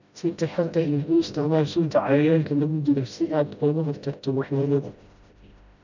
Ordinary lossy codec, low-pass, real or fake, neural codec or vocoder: none; 7.2 kHz; fake; codec, 16 kHz, 0.5 kbps, FreqCodec, smaller model